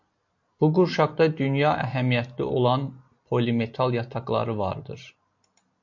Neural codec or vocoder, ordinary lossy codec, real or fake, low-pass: none; MP3, 48 kbps; real; 7.2 kHz